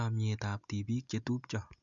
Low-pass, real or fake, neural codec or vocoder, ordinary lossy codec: 7.2 kHz; real; none; none